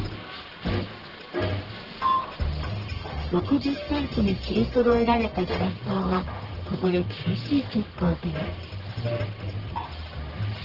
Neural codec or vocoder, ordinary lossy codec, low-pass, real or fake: codec, 44.1 kHz, 1.7 kbps, Pupu-Codec; Opus, 16 kbps; 5.4 kHz; fake